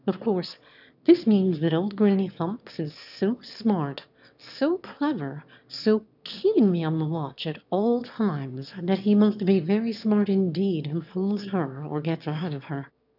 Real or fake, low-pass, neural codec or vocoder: fake; 5.4 kHz; autoencoder, 22.05 kHz, a latent of 192 numbers a frame, VITS, trained on one speaker